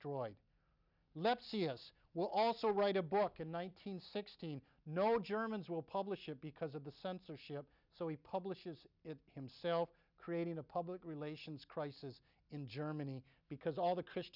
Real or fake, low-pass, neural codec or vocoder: real; 5.4 kHz; none